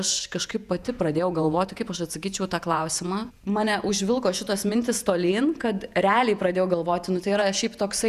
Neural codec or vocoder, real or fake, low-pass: vocoder, 48 kHz, 128 mel bands, Vocos; fake; 14.4 kHz